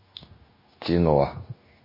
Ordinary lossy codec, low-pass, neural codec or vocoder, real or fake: MP3, 24 kbps; 5.4 kHz; codec, 16 kHz, 0.9 kbps, LongCat-Audio-Codec; fake